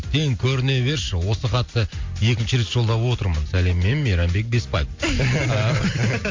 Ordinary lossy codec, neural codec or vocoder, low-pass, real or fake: MP3, 48 kbps; none; 7.2 kHz; real